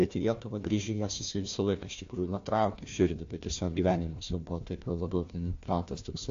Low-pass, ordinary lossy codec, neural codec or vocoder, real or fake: 7.2 kHz; AAC, 48 kbps; codec, 16 kHz, 1 kbps, FunCodec, trained on Chinese and English, 50 frames a second; fake